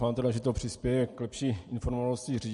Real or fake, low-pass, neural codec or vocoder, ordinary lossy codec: real; 10.8 kHz; none; MP3, 48 kbps